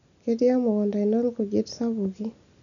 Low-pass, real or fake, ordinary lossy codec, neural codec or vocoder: 7.2 kHz; real; none; none